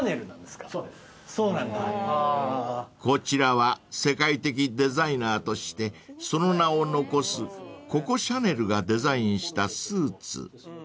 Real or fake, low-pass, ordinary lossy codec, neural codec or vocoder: real; none; none; none